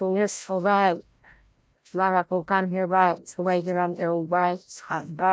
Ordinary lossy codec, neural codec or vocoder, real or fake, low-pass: none; codec, 16 kHz, 0.5 kbps, FreqCodec, larger model; fake; none